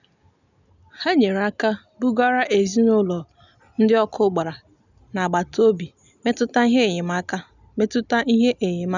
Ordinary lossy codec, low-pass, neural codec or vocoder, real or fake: none; 7.2 kHz; none; real